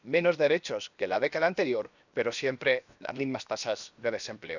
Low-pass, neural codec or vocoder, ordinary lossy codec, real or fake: 7.2 kHz; codec, 16 kHz, 0.7 kbps, FocalCodec; none; fake